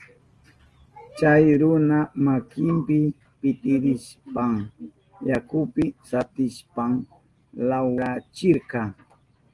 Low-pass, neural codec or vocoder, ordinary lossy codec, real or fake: 10.8 kHz; none; Opus, 24 kbps; real